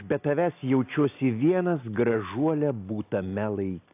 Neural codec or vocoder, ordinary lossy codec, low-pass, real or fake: none; AAC, 24 kbps; 3.6 kHz; real